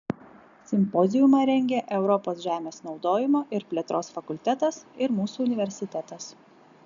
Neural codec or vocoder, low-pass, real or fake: none; 7.2 kHz; real